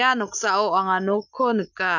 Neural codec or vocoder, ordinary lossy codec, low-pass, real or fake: none; none; 7.2 kHz; real